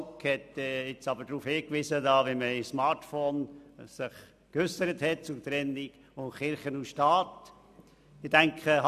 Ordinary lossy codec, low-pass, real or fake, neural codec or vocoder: none; 14.4 kHz; real; none